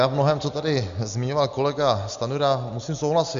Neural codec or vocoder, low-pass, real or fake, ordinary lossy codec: none; 7.2 kHz; real; Opus, 64 kbps